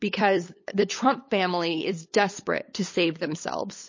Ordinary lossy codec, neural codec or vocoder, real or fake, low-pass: MP3, 32 kbps; codec, 16 kHz, 8 kbps, FunCodec, trained on LibriTTS, 25 frames a second; fake; 7.2 kHz